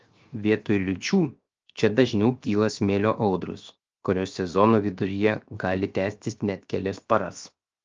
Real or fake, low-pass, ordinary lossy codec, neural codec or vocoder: fake; 7.2 kHz; Opus, 24 kbps; codec, 16 kHz, 0.7 kbps, FocalCodec